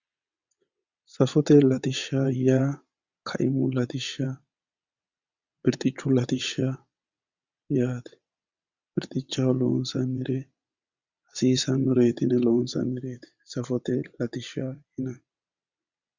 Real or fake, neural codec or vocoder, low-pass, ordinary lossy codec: fake; vocoder, 22.05 kHz, 80 mel bands, WaveNeXt; 7.2 kHz; Opus, 64 kbps